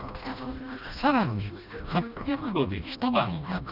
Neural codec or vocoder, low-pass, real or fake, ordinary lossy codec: codec, 16 kHz, 1 kbps, FreqCodec, smaller model; 5.4 kHz; fake; none